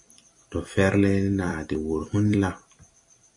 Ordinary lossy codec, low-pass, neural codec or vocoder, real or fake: MP3, 48 kbps; 10.8 kHz; vocoder, 44.1 kHz, 128 mel bands every 256 samples, BigVGAN v2; fake